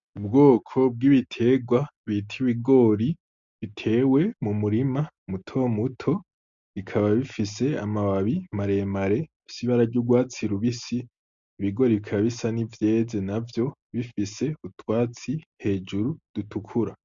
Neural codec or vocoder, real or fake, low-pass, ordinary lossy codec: none; real; 7.2 kHz; MP3, 64 kbps